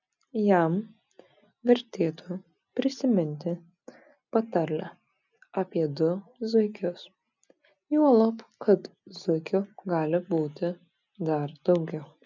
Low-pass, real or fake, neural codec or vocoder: 7.2 kHz; real; none